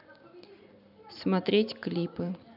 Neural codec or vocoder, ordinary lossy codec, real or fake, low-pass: none; none; real; 5.4 kHz